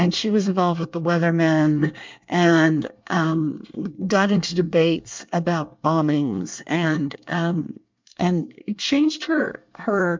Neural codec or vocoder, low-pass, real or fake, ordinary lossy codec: codec, 24 kHz, 1 kbps, SNAC; 7.2 kHz; fake; MP3, 64 kbps